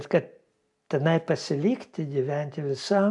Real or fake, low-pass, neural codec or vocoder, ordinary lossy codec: real; 10.8 kHz; none; AAC, 64 kbps